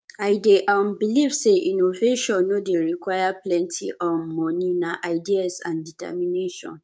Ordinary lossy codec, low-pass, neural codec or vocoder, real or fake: none; none; codec, 16 kHz, 6 kbps, DAC; fake